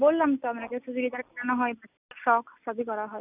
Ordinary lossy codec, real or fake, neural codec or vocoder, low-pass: none; real; none; 3.6 kHz